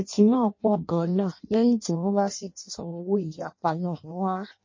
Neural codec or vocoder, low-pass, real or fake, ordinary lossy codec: codec, 16 kHz in and 24 kHz out, 0.6 kbps, FireRedTTS-2 codec; 7.2 kHz; fake; MP3, 32 kbps